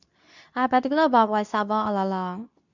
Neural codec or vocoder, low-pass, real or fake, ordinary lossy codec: codec, 24 kHz, 0.9 kbps, WavTokenizer, medium speech release version 2; 7.2 kHz; fake; none